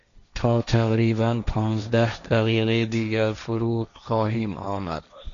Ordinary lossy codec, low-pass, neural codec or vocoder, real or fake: MP3, 96 kbps; 7.2 kHz; codec, 16 kHz, 1.1 kbps, Voila-Tokenizer; fake